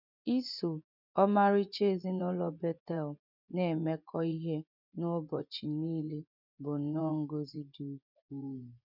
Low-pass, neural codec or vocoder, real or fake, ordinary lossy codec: 5.4 kHz; vocoder, 24 kHz, 100 mel bands, Vocos; fake; none